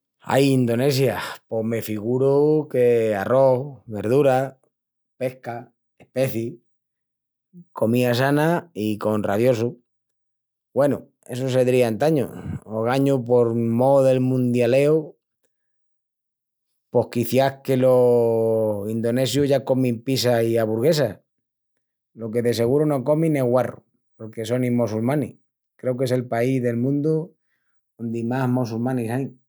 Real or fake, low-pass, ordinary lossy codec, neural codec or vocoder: real; none; none; none